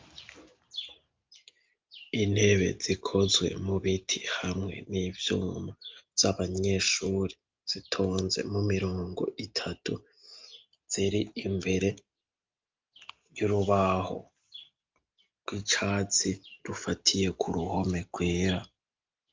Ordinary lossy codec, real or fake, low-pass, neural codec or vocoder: Opus, 32 kbps; real; 7.2 kHz; none